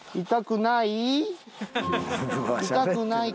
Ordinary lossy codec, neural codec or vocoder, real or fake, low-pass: none; none; real; none